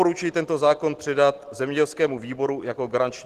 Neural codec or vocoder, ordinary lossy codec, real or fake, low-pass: none; Opus, 16 kbps; real; 14.4 kHz